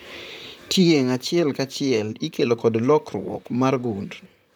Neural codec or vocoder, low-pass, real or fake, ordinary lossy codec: vocoder, 44.1 kHz, 128 mel bands, Pupu-Vocoder; none; fake; none